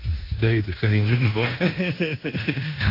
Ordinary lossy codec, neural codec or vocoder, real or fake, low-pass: AAC, 32 kbps; codec, 16 kHz in and 24 kHz out, 0.9 kbps, LongCat-Audio-Codec, fine tuned four codebook decoder; fake; 5.4 kHz